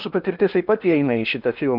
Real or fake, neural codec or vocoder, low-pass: fake; codec, 16 kHz in and 24 kHz out, 0.8 kbps, FocalCodec, streaming, 65536 codes; 5.4 kHz